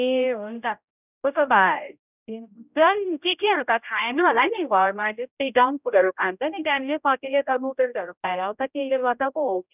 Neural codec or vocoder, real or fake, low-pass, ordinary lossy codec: codec, 16 kHz, 0.5 kbps, X-Codec, HuBERT features, trained on general audio; fake; 3.6 kHz; none